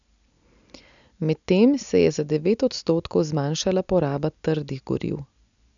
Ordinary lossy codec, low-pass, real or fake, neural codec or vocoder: none; 7.2 kHz; real; none